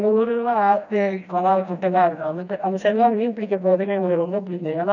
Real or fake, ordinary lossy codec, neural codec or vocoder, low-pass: fake; none; codec, 16 kHz, 1 kbps, FreqCodec, smaller model; 7.2 kHz